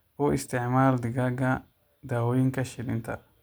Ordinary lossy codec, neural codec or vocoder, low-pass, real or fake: none; none; none; real